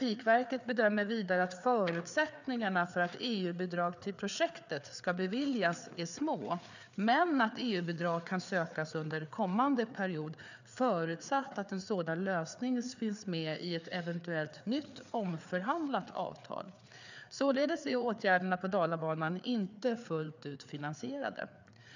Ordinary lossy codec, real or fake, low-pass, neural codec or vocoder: none; fake; 7.2 kHz; codec, 16 kHz, 4 kbps, FreqCodec, larger model